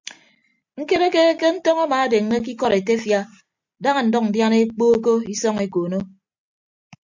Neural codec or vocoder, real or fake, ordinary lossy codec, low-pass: none; real; MP3, 64 kbps; 7.2 kHz